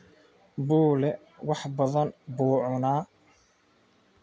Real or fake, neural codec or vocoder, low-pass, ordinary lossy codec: real; none; none; none